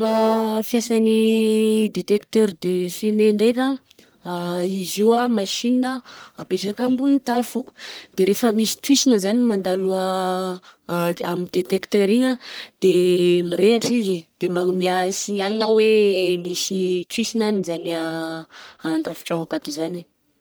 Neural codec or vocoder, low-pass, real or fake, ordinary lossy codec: codec, 44.1 kHz, 1.7 kbps, Pupu-Codec; none; fake; none